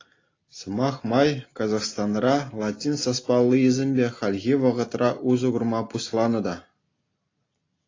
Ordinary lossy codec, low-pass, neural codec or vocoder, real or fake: AAC, 32 kbps; 7.2 kHz; none; real